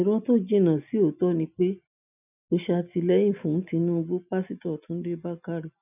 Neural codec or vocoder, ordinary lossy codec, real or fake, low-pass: none; none; real; 3.6 kHz